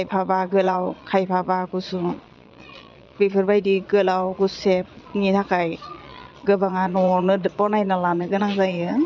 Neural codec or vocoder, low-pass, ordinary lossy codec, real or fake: vocoder, 22.05 kHz, 80 mel bands, Vocos; 7.2 kHz; none; fake